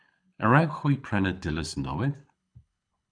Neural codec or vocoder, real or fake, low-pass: codec, 24 kHz, 6 kbps, HILCodec; fake; 9.9 kHz